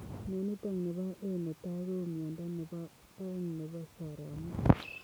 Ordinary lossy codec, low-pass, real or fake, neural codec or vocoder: none; none; real; none